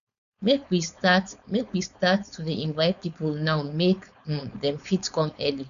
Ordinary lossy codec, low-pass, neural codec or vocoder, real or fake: none; 7.2 kHz; codec, 16 kHz, 4.8 kbps, FACodec; fake